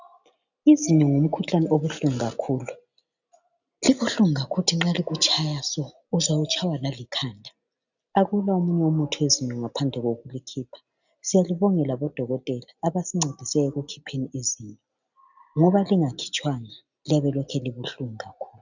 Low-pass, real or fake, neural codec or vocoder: 7.2 kHz; real; none